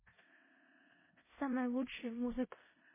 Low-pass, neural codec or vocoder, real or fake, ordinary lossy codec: 3.6 kHz; codec, 16 kHz in and 24 kHz out, 0.4 kbps, LongCat-Audio-Codec, four codebook decoder; fake; MP3, 16 kbps